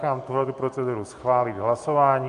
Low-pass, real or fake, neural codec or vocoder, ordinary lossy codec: 10.8 kHz; real; none; AAC, 96 kbps